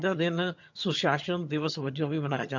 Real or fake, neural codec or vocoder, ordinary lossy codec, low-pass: fake; vocoder, 22.05 kHz, 80 mel bands, HiFi-GAN; MP3, 64 kbps; 7.2 kHz